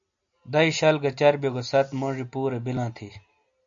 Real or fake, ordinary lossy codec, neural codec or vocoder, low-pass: real; AAC, 64 kbps; none; 7.2 kHz